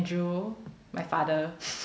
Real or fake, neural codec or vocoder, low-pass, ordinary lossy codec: real; none; none; none